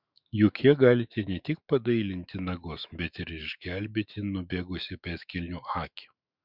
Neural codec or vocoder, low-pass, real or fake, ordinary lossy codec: autoencoder, 48 kHz, 128 numbers a frame, DAC-VAE, trained on Japanese speech; 5.4 kHz; fake; Opus, 64 kbps